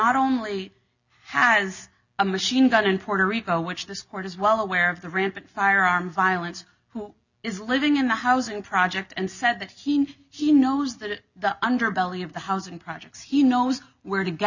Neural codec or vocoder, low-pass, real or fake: none; 7.2 kHz; real